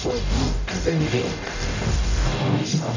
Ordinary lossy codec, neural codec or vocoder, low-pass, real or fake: none; codec, 44.1 kHz, 0.9 kbps, DAC; 7.2 kHz; fake